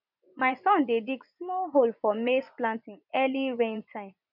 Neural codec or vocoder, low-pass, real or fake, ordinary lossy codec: vocoder, 24 kHz, 100 mel bands, Vocos; 5.4 kHz; fake; none